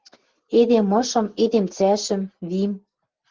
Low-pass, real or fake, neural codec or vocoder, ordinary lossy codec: 7.2 kHz; real; none; Opus, 16 kbps